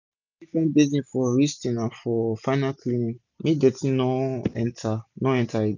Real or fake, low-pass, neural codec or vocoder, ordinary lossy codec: real; 7.2 kHz; none; none